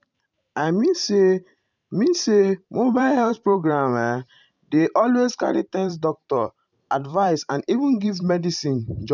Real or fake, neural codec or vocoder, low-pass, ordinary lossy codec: fake; vocoder, 44.1 kHz, 128 mel bands every 512 samples, BigVGAN v2; 7.2 kHz; none